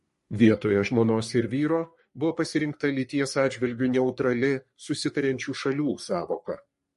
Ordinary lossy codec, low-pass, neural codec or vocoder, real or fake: MP3, 48 kbps; 14.4 kHz; codec, 44.1 kHz, 3.4 kbps, Pupu-Codec; fake